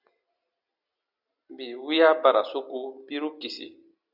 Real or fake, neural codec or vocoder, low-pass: real; none; 5.4 kHz